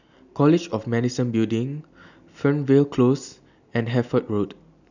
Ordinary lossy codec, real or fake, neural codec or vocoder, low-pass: none; real; none; 7.2 kHz